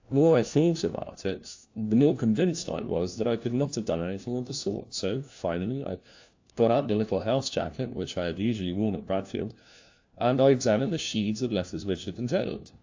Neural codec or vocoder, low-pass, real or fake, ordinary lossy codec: codec, 16 kHz, 1 kbps, FunCodec, trained on LibriTTS, 50 frames a second; 7.2 kHz; fake; AAC, 48 kbps